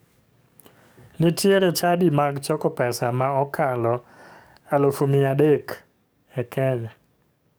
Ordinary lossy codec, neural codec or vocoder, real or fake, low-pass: none; codec, 44.1 kHz, 7.8 kbps, DAC; fake; none